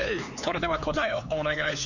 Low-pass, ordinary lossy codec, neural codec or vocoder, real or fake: 7.2 kHz; none; codec, 16 kHz, 4 kbps, X-Codec, HuBERT features, trained on LibriSpeech; fake